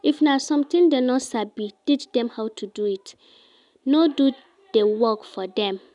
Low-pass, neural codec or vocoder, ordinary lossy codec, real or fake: 10.8 kHz; none; none; real